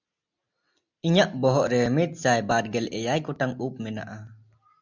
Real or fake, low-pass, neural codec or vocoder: real; 7.2 kHz; none